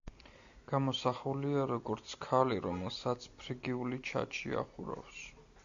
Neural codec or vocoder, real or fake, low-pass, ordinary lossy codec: none; real; 7.2 kHz; MP3, 96 kbps